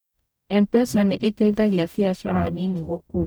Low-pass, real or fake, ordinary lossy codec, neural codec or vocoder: none; fake; none; codec, 44.1 kHz, 0.9 kbps, DAC